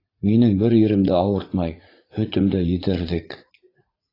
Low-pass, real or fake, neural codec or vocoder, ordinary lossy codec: 5.4 kHz; fake; vocoder, 44.1 kHz, 80 mel bands, Vocos; AAC, 24 kbps